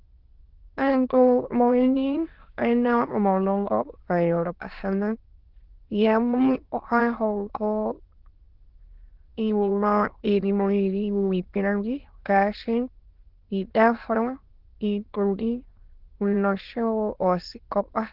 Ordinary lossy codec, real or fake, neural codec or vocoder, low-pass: Opus, 16 kbps; fake; autoencoder, 22.05 kHz, a latent of 192 numbers a frame, VITS, trained on many speakers; 5.4 kHz